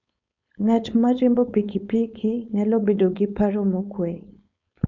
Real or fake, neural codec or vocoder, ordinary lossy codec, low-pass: fake; codec, 16 kHz, 4.8 kbps, FACodec; none; 7.2 kHz